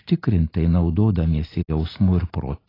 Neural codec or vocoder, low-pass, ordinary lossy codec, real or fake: none; 5.4 kHz; AAC, 24 kbps; real